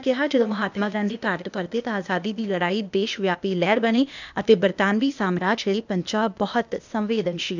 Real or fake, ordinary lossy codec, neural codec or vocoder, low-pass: fake; none; codec, 16 kHz, 0.8 kbps, ZipCodec; 7.2 kHz